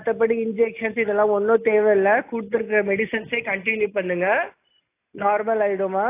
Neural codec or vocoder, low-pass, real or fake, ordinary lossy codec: none; 3.6 kHz; real; AAC, 24 kbps